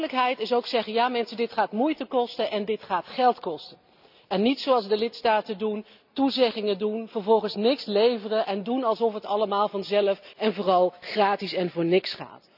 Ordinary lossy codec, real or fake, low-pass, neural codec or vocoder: none; real; 5.4 kHz; none